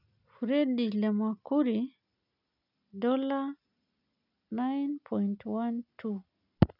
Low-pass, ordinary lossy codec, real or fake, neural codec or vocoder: 5.4 kHz; none; real; none